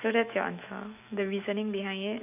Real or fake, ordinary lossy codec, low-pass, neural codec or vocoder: fake; none; 3.6 kHz; autoencoder, 48 kHz, 128 numbers a frame, DAC-VAE, trained on Japanese speech